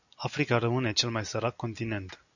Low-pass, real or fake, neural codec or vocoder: 7.2 kHz; real; none